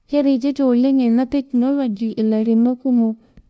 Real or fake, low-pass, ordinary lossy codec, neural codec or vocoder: fake; none; none; codec, 16 kHz, 0.5 kbps, FunCodec, trained on LibriTTS, 25 frames a second